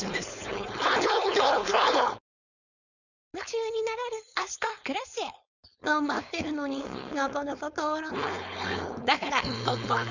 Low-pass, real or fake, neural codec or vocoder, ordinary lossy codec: 7.2 kHz; fake; codec, 16 kHz, 4.8 kbps, FACodec; none